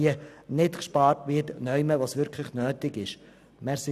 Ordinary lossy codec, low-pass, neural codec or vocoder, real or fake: none; 14.4 kHz; none; real